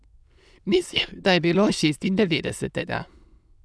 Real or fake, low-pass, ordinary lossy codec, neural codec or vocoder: fake; none; none; autoencoder, 22.05 kHz, a latent of 192 numbers a frame, VITS, trained on many speakers